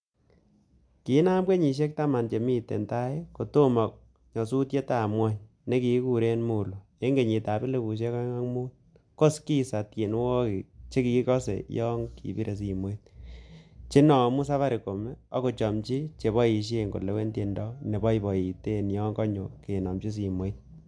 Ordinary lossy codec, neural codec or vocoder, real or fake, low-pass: MP3, 64 kbps; none; real; 9.9 kHz